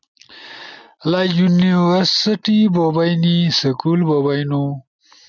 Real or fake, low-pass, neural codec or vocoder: real; 7.2 kHz; none